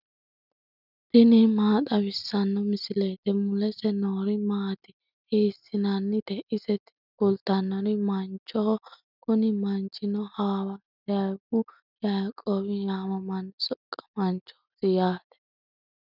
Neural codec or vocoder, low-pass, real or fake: none; 5.4 kHz; real